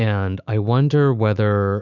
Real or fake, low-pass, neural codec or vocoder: fake; 7.2 kHz; autoencoder, 48 kHz, 128 numbers a frame, DAC-VAE, trained on Japanese speech